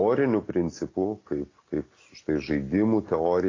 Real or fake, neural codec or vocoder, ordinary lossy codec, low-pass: real; none; AAC, 32 kbps; 7.2 kHz